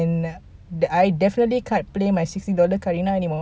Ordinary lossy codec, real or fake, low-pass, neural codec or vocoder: none; real; none; none